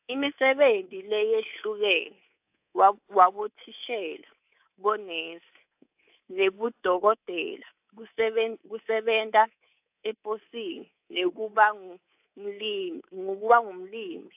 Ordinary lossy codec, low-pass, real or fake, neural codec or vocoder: AAC, 32 kbps; 3.6 kHz; fake; codec, 24 kHz, 3.1 kbps, DualCodec